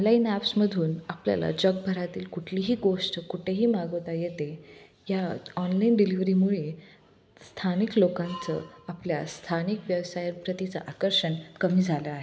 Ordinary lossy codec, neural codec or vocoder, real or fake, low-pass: none; none; real; none